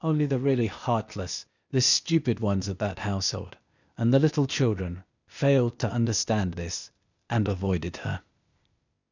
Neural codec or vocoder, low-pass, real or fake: codec, 16 kHz, 0.8 kbps, ZipCodec; 7.2 kHz; fake